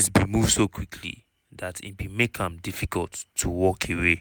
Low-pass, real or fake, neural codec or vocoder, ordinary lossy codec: none; real; none; none